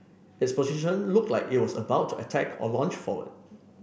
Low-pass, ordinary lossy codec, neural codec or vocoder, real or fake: none; none; none; real